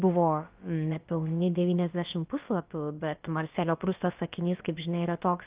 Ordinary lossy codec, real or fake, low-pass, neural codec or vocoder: Opus, 32 kbps; fake; 3.6 kHz; codec, 16 kHz, about 1 kbps, DyCAST, with the encoder's durations